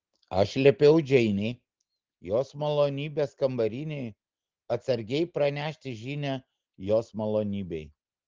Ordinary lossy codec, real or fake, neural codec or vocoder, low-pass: Opus, 16 kbps; real; none; 7.2 kHz